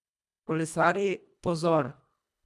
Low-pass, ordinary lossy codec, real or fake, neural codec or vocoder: none; none; fake; codec, 24 kHz, 1.5 kbps, HILCodec